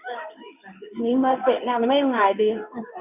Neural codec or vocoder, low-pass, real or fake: codec, 16 kHz in and 24 kHz out, 1 kbps, XY-Tokenizer; 3.6 kHz; fake